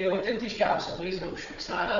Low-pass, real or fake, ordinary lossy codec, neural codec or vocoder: 7.2 kHz; fake; Opus, 64 kbps; codec, 16 kHz, 4 kbps, FunCodec, trained on Chinese and English, 50 frames a second